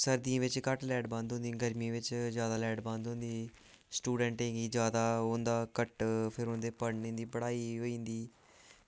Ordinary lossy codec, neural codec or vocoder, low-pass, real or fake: none; none; none; real